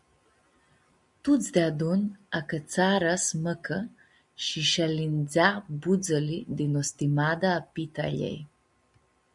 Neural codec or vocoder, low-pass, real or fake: none; 10.8 kHz; real